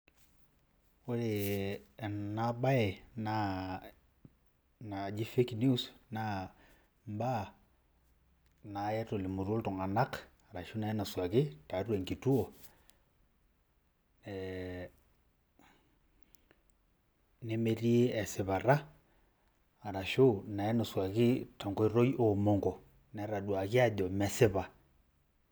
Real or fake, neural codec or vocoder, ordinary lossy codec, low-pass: real; none; none; none